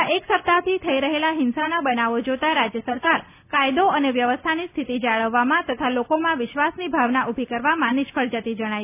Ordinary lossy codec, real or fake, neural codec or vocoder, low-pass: none; real; none; 3.6 kHz